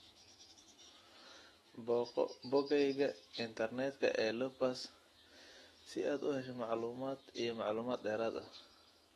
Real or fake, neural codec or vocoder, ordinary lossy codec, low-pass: fake; autoencoder, 48 kHz, 128 numbers a frame, DAC-VAE, trained on Japanese speech; AAC, 32 kbps; 19.8 kHz